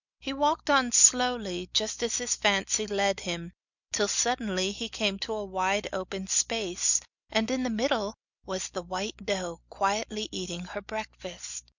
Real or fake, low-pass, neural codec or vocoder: real; 7.2 kHz; none